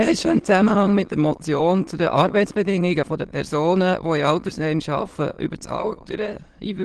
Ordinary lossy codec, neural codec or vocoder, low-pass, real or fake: Opus, 16 kbps; autoencoder, 22.05 kHz, a latent of 192 numbers a frame, VITS, trained on many speakers; 9.9 kHz; fake